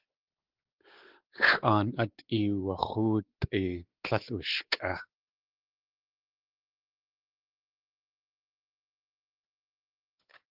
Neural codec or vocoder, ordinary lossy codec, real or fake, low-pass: codec, 16 kHz, 4 kbps, X-Codec, WavLM features, trained on Multilingual LibriSpeech; Opus, 16 kbps; fake; 5.4 kHz